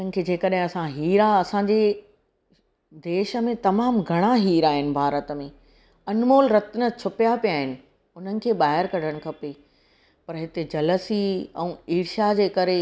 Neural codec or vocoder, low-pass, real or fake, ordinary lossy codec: none; none; real; none